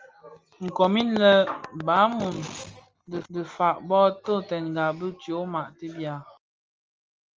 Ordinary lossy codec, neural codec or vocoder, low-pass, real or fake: Opus, 24 kbps; none; 7.2 kHz; real